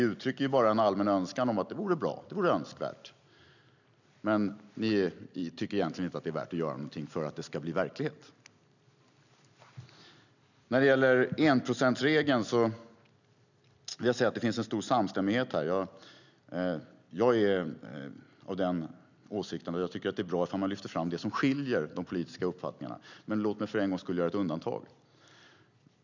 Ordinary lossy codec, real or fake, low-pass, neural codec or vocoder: none; real; 7.2 kHz; none